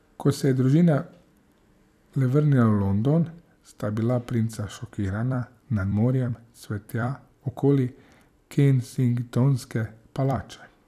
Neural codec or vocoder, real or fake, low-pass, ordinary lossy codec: vocoder, 44.1 kHz, 128 mel bands every 256 samples, BigVGAN v2; fake; 14.4 kHz; none